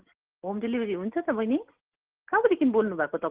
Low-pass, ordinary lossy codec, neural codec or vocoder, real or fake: 3.6 kHz; Opus, 16 kbps; none; real